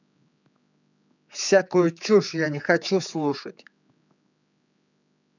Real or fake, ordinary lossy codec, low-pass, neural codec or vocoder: fake; none; 7.2 kHz; codec, 16 kHz, 4 kbps, X-Codec, HuBERT features, trained on general audio